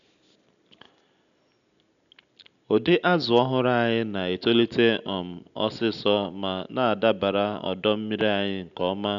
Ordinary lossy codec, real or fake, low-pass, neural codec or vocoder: none; real; 7.2 kHz; none